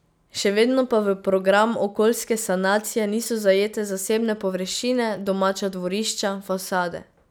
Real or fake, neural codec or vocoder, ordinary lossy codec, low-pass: real; none; none; none